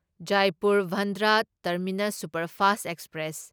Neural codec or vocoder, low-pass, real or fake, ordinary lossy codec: none; none; real; none